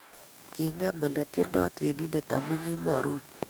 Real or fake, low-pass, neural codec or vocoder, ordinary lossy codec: fake; none; codec, 44.1 kHz, 2.6 kbps, DAC; none